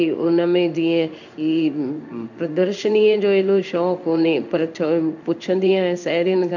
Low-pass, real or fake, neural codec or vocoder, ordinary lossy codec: 7.2 kHz; fake; codec, 16 kHz in and 24 kHz out, 1 kbps, XY-Tokenizer; none